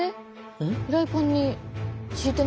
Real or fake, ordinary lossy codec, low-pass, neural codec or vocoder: real; none; none; none